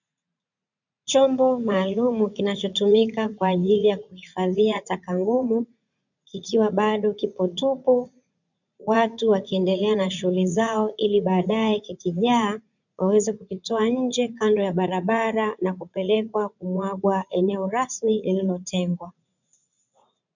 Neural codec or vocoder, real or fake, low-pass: vocoder, 44.1 kHz, 80 mel bands, Vocos; fake; 7.2 kHz